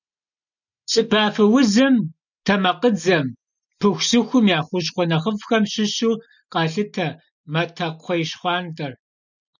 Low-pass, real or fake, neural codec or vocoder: 7.2 kHz; real; none